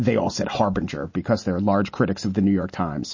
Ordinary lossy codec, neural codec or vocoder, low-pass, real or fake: MP3, 32 kbps; none; 7.2 kHz; real